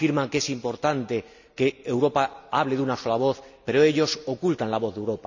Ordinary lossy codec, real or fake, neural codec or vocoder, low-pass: none; real; none; 7.2 kHz